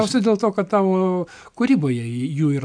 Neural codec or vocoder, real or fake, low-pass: none; real; 9.9 kHz